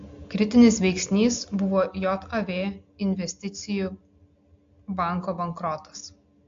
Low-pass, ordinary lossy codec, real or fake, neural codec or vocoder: 7.2 kHz; AAC, 48 kbps; real; none